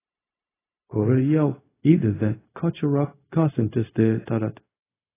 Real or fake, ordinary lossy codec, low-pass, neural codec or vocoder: fake; AAC, 16 kbps; 3.6 kHz; codec, 16 kHz, 0.4 kbps, LongCat-Audio-Codec